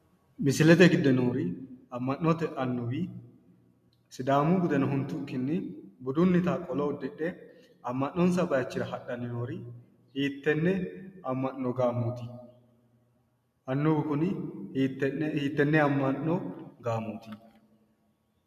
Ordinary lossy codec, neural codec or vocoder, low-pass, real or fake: MP3, 96 kbps; none; 14.4 kHz; real